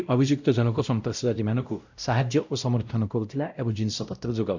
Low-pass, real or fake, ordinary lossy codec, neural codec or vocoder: 7.2 kHz; fake; none; codec, 16 kHz, 0.5 kbps, X-Codec, WavLM features, trained on Multilingual LibriSpeech